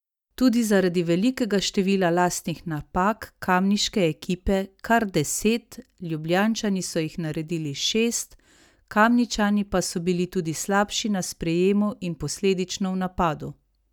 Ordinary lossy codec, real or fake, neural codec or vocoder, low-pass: none; real; none; 19.8 kHz